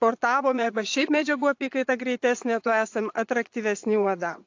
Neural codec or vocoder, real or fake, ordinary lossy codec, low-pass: vocoder, 22.05 kHz, 80 mel bands, Vocos; fake; AAC, 48 kbps; 7.2 kHz